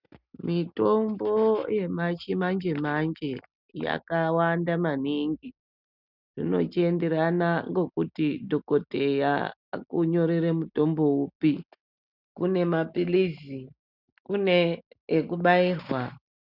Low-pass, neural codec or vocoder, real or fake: 5.4 kHz; none; real